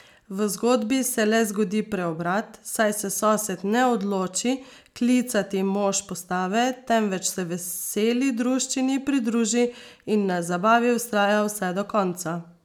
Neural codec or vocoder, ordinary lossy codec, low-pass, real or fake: none; none; 19.8 kHz; real